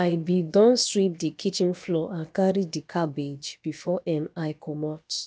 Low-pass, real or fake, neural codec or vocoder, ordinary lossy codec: none; fake; codec, 16 kHz, about 1 kbps, DyCAST, with the encoder's durations; none